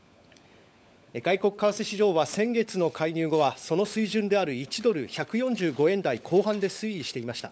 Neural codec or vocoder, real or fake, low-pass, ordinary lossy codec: codec, 16 kHz, 16 kbps, FunCodec, trained on LibriTTS, 50 frames a second; fake; none; none